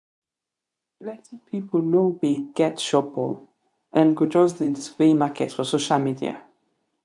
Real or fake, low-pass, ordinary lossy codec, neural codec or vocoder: fake; 10.8 kHz; none; codec, 24 kHz, 0.9 kbps, WavTokenizer, medium speech release version 2